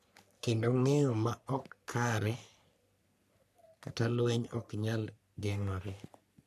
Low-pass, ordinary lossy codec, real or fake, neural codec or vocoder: 14.4 kHz; none; fake; codec, 44.1 kHz, 3.4 kbps, Pupu-Codec